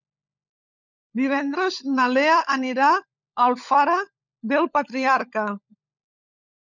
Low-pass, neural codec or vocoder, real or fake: 7.2 kHz; codec, 16 kHz, 16 kbps, FunCodec, trained on LibriTTS, 50 frames a second; fake